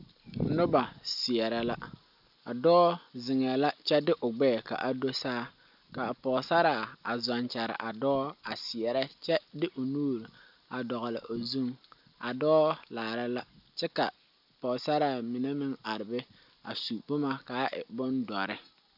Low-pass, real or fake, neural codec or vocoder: 5.4 kHz; real; none